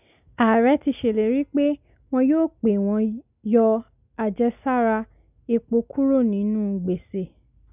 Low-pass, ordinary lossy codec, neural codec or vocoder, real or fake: 3.6 kHz; none; none; real